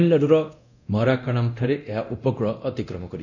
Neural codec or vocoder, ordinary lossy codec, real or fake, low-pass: codec, 24 kHz, 0.9 kbps, DualCodec; none; fake; 7.2 kHz